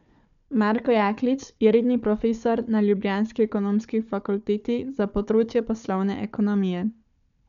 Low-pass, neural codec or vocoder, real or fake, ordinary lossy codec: 7.2 kHz; codec, 16 kHz, 4 kbps, FunCodec, trained on Chinese and English, 50 frames a second; fake; none